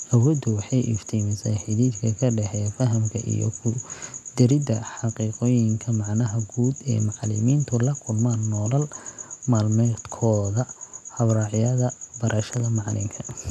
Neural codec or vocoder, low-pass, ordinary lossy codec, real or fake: none; none; none; real